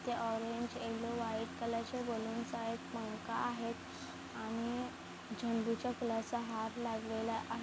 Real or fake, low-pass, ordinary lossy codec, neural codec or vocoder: real; none; none; none